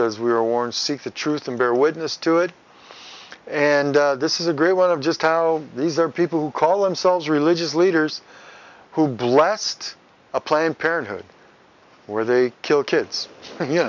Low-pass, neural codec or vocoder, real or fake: 7.2 kHz; none; real